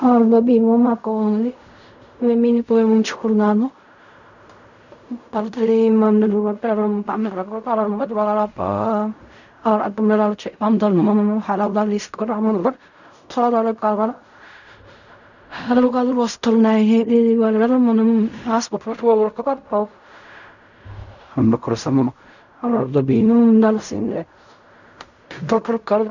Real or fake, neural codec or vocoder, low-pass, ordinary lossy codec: fake; codec, 16 kHz in and 24 kHz out, 0.4 kbps, LongCat-Audio-Codec, fine tuned four codebook decoder; 7.2 kHz; none